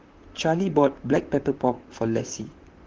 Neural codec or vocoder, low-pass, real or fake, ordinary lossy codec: vocoder, 44.1 kHz, 128 mel bands, Pupu-Vocoder; 7.2 kHz; fake; Opus, 16 kbps